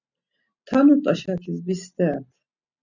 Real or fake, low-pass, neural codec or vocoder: real; 7.2 kHz; none